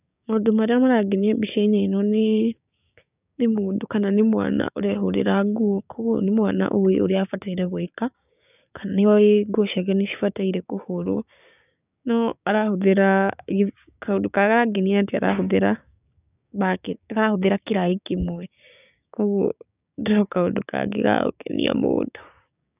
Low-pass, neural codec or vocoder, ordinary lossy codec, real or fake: 3.6 kHz; codec, 16 kHz, 6 kbps, DAC; none; fake